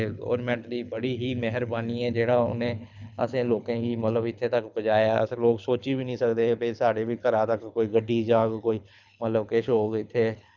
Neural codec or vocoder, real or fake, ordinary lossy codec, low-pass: codec, 24 kHz, 6 kbps, HILCodec; fake; none; 7.2 kHz